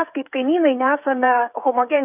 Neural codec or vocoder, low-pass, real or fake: codec, 16 kHz, 8 kbps, FreqCodec, smaller model; 3.6 kHz; fake